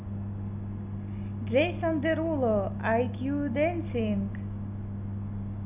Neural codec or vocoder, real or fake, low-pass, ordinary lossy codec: none; real; 3.6 kHz; none